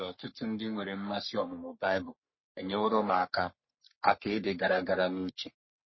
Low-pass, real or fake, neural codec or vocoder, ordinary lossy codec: 7.2 kHz; fake; codec, 44.1 kHz, 3.4 kbps, Pupu-Codec; MP3, 24 kbps